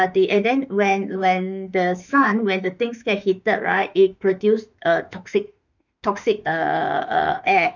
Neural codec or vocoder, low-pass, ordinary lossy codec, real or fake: autoencoder, 48 kHz, 32 numbers a frame, DAC-VAE, trained on Japanese speech; 7.2 kHz; none; fake